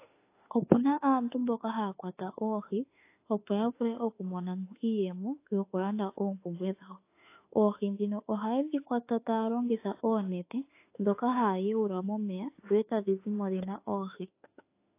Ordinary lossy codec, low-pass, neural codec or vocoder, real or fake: AAC, 24 kbps; 3.6 kHz; autoencoder, 48 kHz, 32 numbers a frame, DAC-VAE, trained on Japanese speech; fake